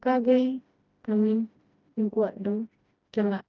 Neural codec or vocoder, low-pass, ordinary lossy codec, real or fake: codec, 16 kHz, 1 kbps, FreqCodec, smaller model; 7.2 kHz; Opus, 32 kbps; fake